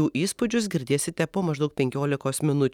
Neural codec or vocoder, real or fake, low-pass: none; real; 19.8 kHz